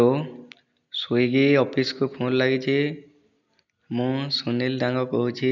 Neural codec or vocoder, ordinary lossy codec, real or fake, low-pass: none; none; real; 7.2 kHz